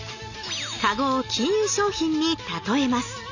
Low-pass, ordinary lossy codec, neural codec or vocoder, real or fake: 7.2 kHz; none; none; real